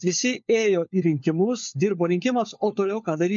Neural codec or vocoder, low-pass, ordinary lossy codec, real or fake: codec, 16 kHz, 4 kbps, FunCodec, trained on LibriTTS, 50 frames a second; 7.2 kHz; MP3, 48 kbps; fake